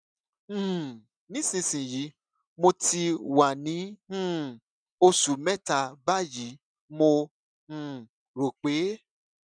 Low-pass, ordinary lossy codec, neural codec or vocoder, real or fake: 9.9 kHz; none; none; real